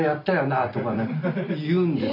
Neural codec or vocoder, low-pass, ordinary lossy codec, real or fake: none; 5.4 kHz; MP3, 48 kbps; real